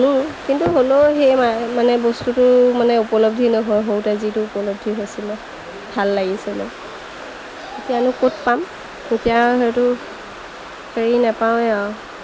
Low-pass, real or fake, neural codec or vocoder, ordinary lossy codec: none; real; none; none